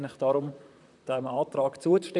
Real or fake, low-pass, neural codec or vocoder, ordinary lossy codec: fake; 10.8 kHz; vocoder, 44.1 kHz, 128 mel bands, Pupu-Vocoder; none